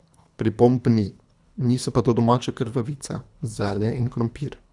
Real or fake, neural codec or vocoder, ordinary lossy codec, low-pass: fake; codec, 24 kHz, 3 kbps, HILCodec; none; 10.8 kHz